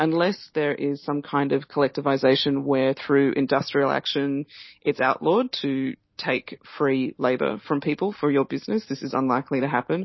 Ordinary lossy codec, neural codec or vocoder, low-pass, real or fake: MP3, 24 kbps; none; 7.2 kHz; real